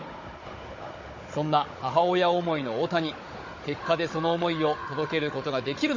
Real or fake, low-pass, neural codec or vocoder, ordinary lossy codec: fake; 7.2 kHz; codec, 16 kHz, 16 kbps, FunCodec, trained on Chinese and English, 50 frames a second; MP3, 32 kbps